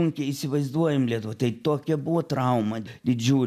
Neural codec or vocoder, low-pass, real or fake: none; 14.4 kHz; real